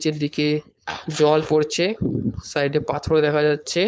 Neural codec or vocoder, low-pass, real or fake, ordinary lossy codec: codec, 16 kHz, 4.8 kbps, FACodec; none; fake; none